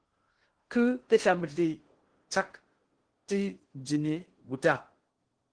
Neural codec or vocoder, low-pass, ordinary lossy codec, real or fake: codec, 16 kHz in and 24 kHz out, 0.6 kbps, FocalCodec, streaming, 2048 codes; 9.9 kHz; Opus, 16 kbps; fake